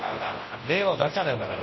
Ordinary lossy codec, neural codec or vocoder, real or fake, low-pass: MP3, 24 kbps; codec, 24 kHz, 0.9 kbps, WavTokenizer, large speech release; fake; 7.2 kHz